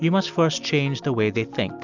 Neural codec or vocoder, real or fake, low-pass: none; real; 7.2 kHz